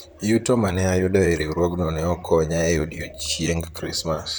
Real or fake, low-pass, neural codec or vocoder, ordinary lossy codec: fake; none; vocoder, 44.1 kHz, 128 mel bands, Pupu-Vocoder; none